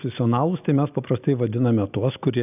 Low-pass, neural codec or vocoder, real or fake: 3.6 kHz; none; real